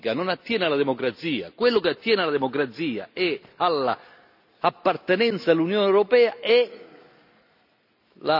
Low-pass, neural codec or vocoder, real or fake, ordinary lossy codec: 5.4 kHz; none; real; none